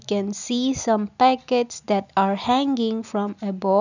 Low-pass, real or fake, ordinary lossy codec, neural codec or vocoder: 7.2 kHz; real; none; none